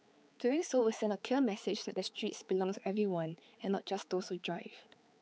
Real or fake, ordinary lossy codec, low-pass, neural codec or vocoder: fake; none; none; codec, 16 kHz, 4 kbps, X-Codec, HuBERT features, trained on balanced general audio